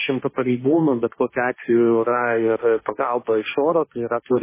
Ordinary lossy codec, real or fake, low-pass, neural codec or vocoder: MP3, 16 kbps; fake; 3.6 kHz; codec, 16 kHz, 1.1 kbps, Voila-Tokenizer